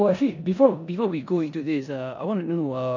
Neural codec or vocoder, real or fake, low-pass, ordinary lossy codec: codec, 16 kHz in and 24 kHz out, 0.9 kbps, LongCat-Audio-Codec, four codebook decoder; fake; 7.2 kHz; none